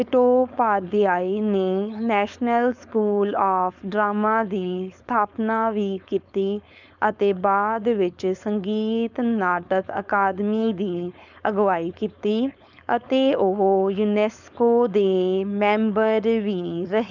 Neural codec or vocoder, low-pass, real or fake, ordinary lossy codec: codec, 16 kHz, 4.8 kbps, FACodec; 7.2 kHz; fake; none